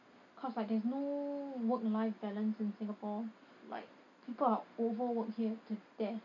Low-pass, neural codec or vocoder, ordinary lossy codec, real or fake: 7.2 kHz; none; AAC, 48 kbps; real